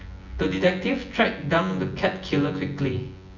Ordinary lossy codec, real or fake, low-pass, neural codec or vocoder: none; fake; 7.2 kHz; vocoder, 24 kHz, 100 mel bands, Vocos